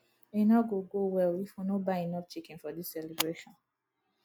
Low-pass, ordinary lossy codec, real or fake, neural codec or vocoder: 19.8 kHz; none; real; none